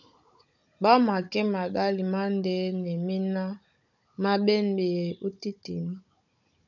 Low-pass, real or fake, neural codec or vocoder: 7.2 kHz; fake; codec, 16 kHz, 16 kbps, FunCodec, trained on Chinese and English, 50 frames a second